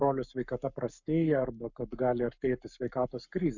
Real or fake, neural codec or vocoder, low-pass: fake; codec, 44.1 kHz, 7.8 kbps, Pupu-Codec; 7.2 kHz